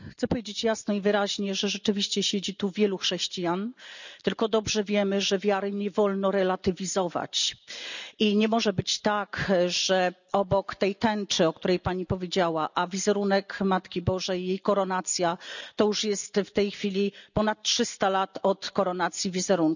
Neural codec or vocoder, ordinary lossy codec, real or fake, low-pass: none; none; real; 7.2 kHz